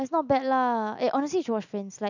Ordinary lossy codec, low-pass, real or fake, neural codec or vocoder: none; 7.2 kHz; real; none